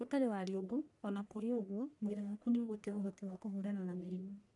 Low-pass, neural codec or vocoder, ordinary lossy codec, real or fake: 10.8 kHz; codec, 44.1 kHz, 1.7 kbps, Pupu-Codec; MP3, 96 kbps; fake